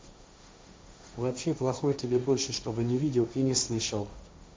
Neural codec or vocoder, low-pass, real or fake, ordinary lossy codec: codec, 16 kHz, 1.1 kbps, Voila-Tokenizer; none; fake; none